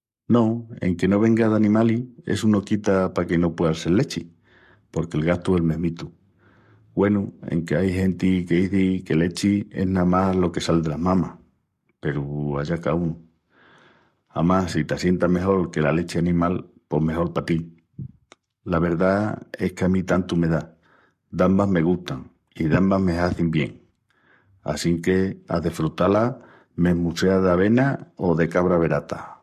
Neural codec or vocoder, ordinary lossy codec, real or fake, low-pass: codec, 44.1 kHz, 7.8 kbps, Pupu-Codec; MP3, 64 kbps; fake; 14.4 kHz